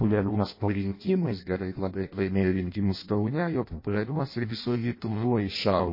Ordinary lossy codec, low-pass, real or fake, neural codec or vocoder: MP3, 24 kbps; 5.4 kHz; fake; codec, 16 kHz in and 24 kHz out, 0.6 kbps, FireRedTTS-2 codec